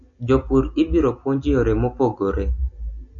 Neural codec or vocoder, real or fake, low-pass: none; real; 7.2 kHz